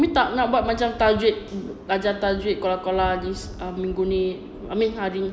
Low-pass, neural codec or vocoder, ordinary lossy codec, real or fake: none; none; none; real